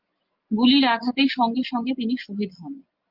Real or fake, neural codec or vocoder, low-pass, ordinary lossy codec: real; none; 5.4 kHz; Opus, 24 kbps